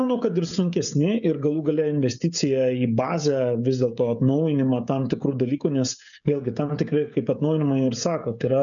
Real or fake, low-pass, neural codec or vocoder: fake; 7.2 kHz; codec, 16 kHz, 16 kbps, FreqCodec, smaller model